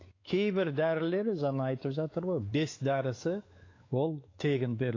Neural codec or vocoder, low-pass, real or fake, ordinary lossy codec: codec, 16 kHz, 4 kbps, X-Codec, WavLM features, trained on Multilingual LibriSpeech; 7.2 kHz; fake; AAC, 32 kbps